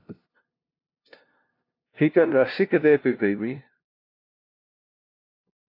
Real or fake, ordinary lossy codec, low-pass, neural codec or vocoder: fake; AAC, 32 kbps; 5.4 kHz; codec, 16 kHz, 0.5 kbps, FunCodec, trained on LibriTTS, 25 frames a second